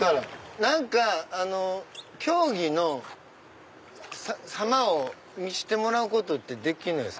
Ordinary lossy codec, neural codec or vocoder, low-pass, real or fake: none; none; none; real